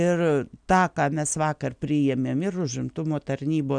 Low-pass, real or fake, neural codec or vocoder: 9.9 kHz; real; none